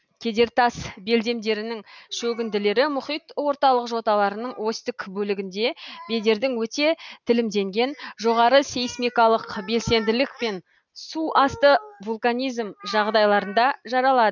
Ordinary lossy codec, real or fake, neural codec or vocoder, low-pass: none; real; none; 7.2 kHz